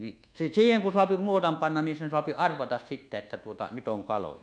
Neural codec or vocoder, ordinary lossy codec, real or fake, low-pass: codec, 24 kHz, 1.2 kbps, DualCodec; none; fake; 9.9 kHz